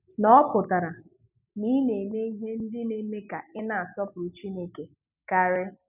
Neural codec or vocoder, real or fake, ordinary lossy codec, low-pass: none; real; none; 3.6 kHz